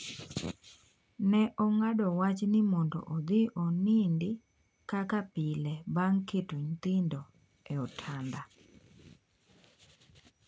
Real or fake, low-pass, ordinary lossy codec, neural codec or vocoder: real; none; none; none